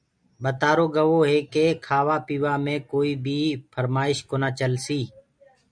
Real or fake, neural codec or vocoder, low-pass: real; none; 9.9 kHz